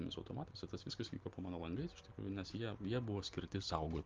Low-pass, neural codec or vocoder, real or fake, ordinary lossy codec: 7.2 kHz; none; real; Opus, 32 kbps